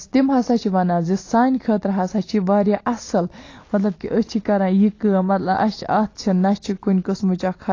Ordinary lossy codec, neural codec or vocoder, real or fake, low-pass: AAC, 32 kbps; none; real; 7.2 kHz